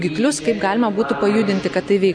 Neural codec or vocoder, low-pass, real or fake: none; 9.9 kHz; real